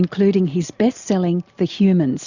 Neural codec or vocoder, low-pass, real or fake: none; 7.2 kHz; real